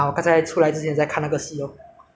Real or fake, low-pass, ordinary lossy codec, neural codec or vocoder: real; none; none; none